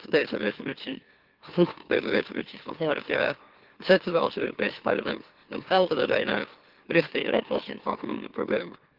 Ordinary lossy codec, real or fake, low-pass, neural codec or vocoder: Opus, 16 kbps; fake; 5.4 kHz; autoencoder, 44.1 kHz, a latent of 192 numbers a frame, MeloTTS